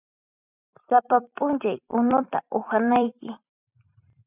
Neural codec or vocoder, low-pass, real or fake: vocoder, 44.1 kHz, 128 mel bands every 256 samples, BigVGAN v2; 3.6 kHz; fake